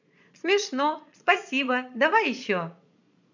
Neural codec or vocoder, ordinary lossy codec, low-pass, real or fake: vocoder, 22.05 kHz, 80 mel bands, Vocos; none; 7.2 kHz; fake